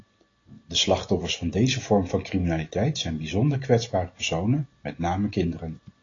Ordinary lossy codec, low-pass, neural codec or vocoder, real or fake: AAC, 32 kbps; 7.2 kHz; none; real